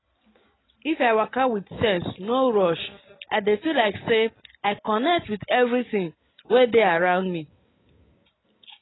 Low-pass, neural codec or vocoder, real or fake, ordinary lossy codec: 7.2 kHz; none; real; AAC, 16 kbps